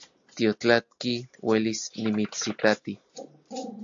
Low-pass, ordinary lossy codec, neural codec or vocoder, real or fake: 7.2 kHz; AAC, 64 kbps; none; real